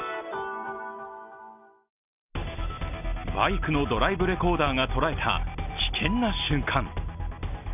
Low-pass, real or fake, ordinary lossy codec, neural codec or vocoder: 3.6 kHz; real; none; none